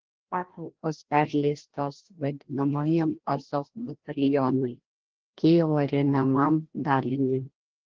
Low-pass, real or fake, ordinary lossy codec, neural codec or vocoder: 7.2 kHz; fake; Opus, 16 kbps; codec, 16 kHz, 1 kbps, FreqCodec, larger model